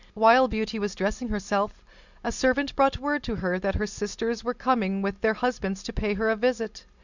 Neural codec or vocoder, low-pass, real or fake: none; 7.2 kHz; real